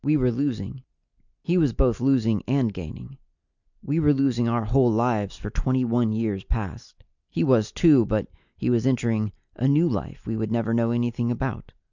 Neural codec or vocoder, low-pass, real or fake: none; 7.2 kHz; real